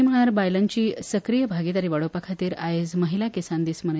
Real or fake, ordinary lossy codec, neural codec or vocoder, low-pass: real; none; none; none